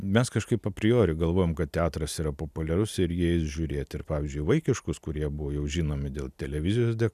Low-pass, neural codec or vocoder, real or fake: 14.4 kHz; none; real